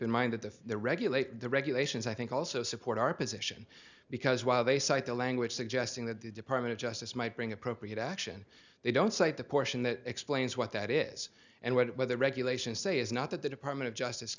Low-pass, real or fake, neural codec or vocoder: 7.2 kHz; real; none